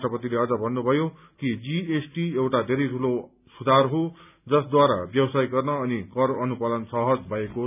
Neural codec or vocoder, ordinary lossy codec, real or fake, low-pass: none; none; real; 3.6 kHz